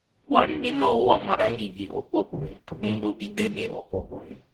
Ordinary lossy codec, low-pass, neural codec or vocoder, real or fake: Opus, 16 kbps; 19.8 kHz; codec, 44.1 kHz, 0.9 kbps, DAC; fake